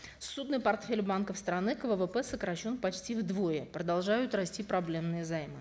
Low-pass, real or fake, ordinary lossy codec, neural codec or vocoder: none; real; none; none